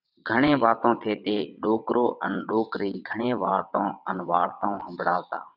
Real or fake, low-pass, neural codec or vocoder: fake; 5.4 kHz; vocoder, 22.05 kHz, 80 mel bands, WaveNeXt